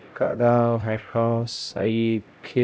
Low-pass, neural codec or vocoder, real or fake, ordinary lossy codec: none; codec, 16 kHz, 0.5 kbps, X-Codec, HuBERT features, trained on LibriSpeech; fake; none